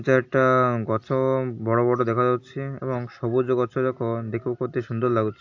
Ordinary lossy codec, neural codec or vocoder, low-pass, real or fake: AAC, 48 kbps; none; 7.2 kHz; real